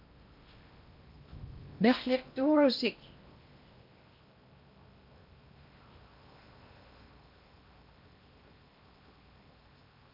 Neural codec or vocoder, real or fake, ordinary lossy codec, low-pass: codec, 16 kHz in and 24 kHz out, 0.8 kbps, FocalCodec, streaming, 65536 codes; fake; MP3, 48 kbps; 5.4 kHz